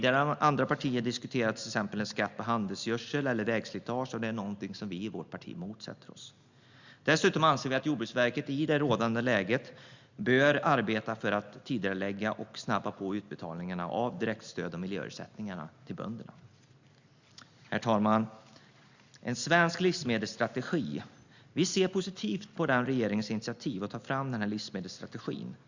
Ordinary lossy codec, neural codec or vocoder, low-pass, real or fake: Opus, 64 kbps; none; 7.2 kHz; real